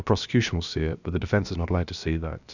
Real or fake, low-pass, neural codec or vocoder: fake; 7.2 kHz; codec, 16 kHz, about 1 kbps, DyCAST, with the encoder's durations